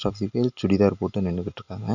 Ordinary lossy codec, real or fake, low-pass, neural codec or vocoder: none; real; 7.2 kHz; none